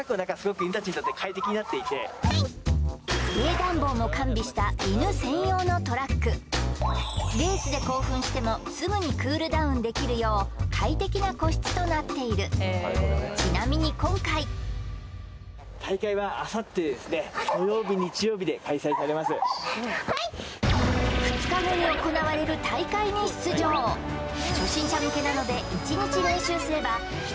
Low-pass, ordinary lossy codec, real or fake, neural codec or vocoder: none; none; real; none